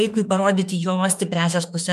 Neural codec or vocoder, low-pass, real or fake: autoencoder, 48 kHz, 32 numbers a frame, DAC-VAE, trained on Japanese speech; 14.4 kHz; fake